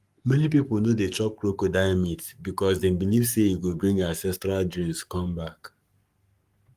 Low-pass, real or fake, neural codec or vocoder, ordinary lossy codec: 14.4 kHz; fake; codec, 44.1 kHz, 7.8 kbps, Pupu-Codec; Opus, 32 kbps